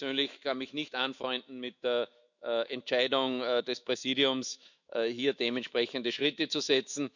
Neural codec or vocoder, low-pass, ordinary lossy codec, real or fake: autoencoder, 48 kHz, 128 numbers a frame, DAC-VAE, trained on Japanese speech; 7.2 kHz; none; fake